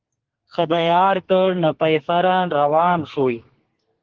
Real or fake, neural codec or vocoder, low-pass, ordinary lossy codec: fake; codec, 32 kHz, 1.9 kbps, SNAC; 7.2 kHz; Opus, 16 kbps